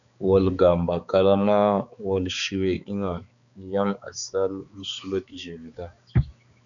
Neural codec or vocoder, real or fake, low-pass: codec, 16 kHz, 4 kbps, X-Codec, HuBERT features, trained on balanced general audio; fake; 7.2 kHz